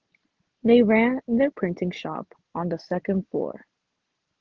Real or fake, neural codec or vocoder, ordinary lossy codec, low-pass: real; none; Opus, 16 kbps; 7.2 kHz